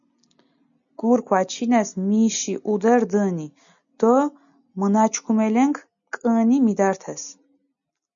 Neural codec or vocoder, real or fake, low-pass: none; real; 7.2 kHz